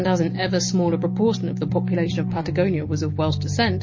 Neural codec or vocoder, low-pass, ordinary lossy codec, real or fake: none; 7.2 kHz; MP3, 32 kbps; real